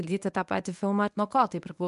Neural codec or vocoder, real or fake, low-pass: codec, 24 kHz, 0.9 kbps, WavTokenizer, medium speech release version 1; fake; 10.8 kHz